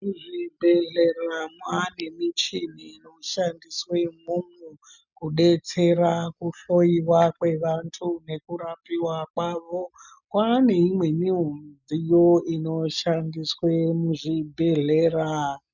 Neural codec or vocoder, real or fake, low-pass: none; real; 7.2 kHz